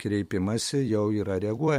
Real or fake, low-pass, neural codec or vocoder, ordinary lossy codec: fake; 19.8 kHz; vocoder, 44.1 kHz, 128 mel bands every 256 samples, BigVGAN v2; MP3, 64 kbps